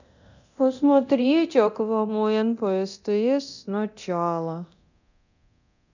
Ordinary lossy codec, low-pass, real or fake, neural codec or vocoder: none; 7.2 kHz; fake; codec, 24 kHz, 0.9 kbps, DualCodec